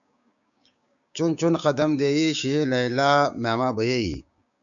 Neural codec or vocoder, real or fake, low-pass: codec, 16 kHz, 6 kbps, DAC; fake; 7.2 kHz